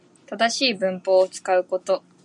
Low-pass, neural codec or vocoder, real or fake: 10.8 kHz; none; real